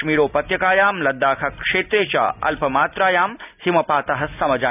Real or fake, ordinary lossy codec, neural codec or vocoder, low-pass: real; none; none; 3.6 kHz